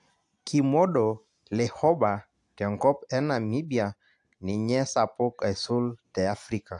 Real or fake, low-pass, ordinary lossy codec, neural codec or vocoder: real; 10.8 kHz; AAC, 64 kbps; none